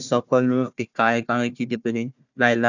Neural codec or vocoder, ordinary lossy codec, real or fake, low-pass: codec, 16 kHz, 1 kbps, FunCodec, trained on Chinese and English, 50 frames a second; none; fake; 7.2 kHz